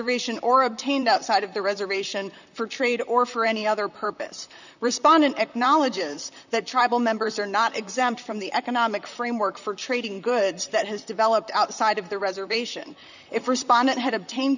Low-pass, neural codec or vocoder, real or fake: 7.2 kHz; vocoder, 44.1 kHz, 128 mel bands, Pupu-Vocoder; fake